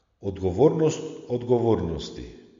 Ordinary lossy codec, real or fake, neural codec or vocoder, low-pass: MP3, 48 kbps; real; none; 7.2 kHz